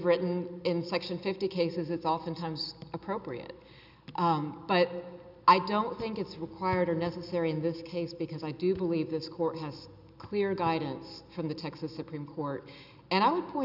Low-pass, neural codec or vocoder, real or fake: 5.4 kHz; none; real